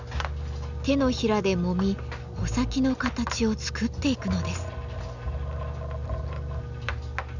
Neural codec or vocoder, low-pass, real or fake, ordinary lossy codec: none; 7.2 kHz; real; none